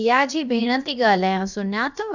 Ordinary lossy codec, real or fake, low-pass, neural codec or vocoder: none; fake; 7.2 kHz; codec, 16 kHz, about 1 kbps, DyCAST, with the encoder's durations